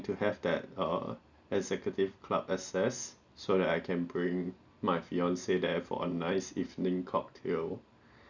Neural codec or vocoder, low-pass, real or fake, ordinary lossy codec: none; 7.2 kHz; real; none